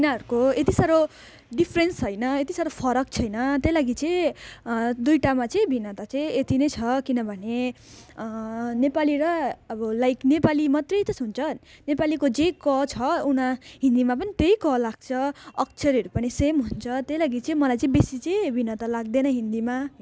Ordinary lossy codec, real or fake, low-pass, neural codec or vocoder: none; real; none; none